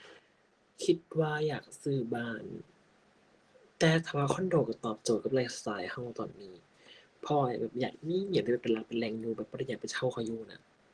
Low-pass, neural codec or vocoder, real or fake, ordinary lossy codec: 9.9 kHz; none; real; Opus, 16 kbps